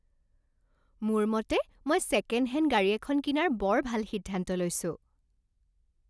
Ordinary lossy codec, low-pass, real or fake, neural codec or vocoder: none; none; real; none